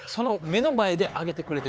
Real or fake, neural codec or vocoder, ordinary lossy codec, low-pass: fake; codec, 16 kHz, 4 kbps, X-Codec, HuBERT features, trained on LibriSpeech; none; none